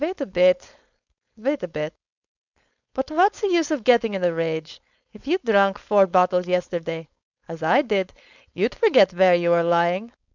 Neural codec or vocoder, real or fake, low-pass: codec, 16 kHz, 4.8 kbps, FACodec; fake; 7.2 kHz